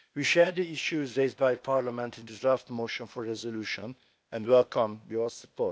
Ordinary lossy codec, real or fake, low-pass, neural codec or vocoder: none; fake; none; codec, 16 kHz, 0.8 kbps, ZipCodec